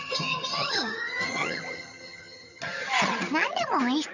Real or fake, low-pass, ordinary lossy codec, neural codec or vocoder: fake; 7.2 kHz; none; vocoder, 22.05 kHz, 80 mel bands, HiFi-GAN